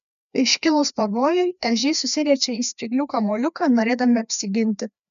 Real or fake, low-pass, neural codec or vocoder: fake; 7.2 kHz; codec, 16 kHz, 2 kbps, FreqCodec, larger model